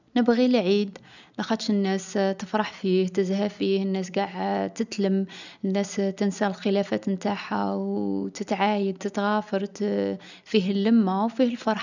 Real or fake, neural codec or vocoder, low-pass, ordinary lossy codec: real; none; 7.2 kHz; none